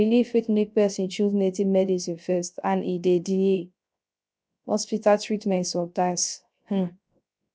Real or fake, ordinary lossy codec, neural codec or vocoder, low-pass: fake; none; codec, 16 kHz, 0.3 kbps, FocalCodec; none